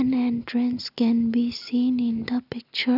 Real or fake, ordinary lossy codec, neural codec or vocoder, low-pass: real; none; none; 5.4 kHz